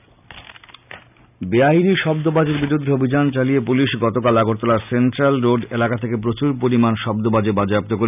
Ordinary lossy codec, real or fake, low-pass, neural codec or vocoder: none; real; 3.6 kHz; none